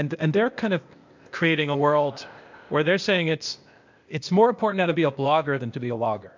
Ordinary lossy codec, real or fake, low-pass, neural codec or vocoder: MP3, 64 kbps; fake; 7.2 kHz; codec, 16 kHz, 0.8 kbps, ZipCodec